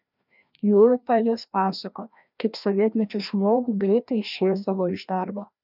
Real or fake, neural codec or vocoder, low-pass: fake; codec, 16 kHz, 1 kbps, FreqCodec, larger model; 5.4 kHz